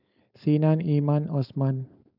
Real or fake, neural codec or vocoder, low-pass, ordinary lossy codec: fake; codec, 16 kHz, 4.8 kbps, FACodec; 5.4 kHz; none